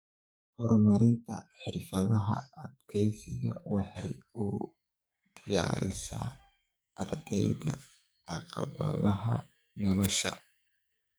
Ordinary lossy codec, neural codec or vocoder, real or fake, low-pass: none; codec, 44.1 kHz, 2.6 kbps, SNAC; fake; none